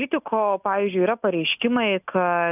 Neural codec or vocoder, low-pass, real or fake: none; 3.6 kHz; real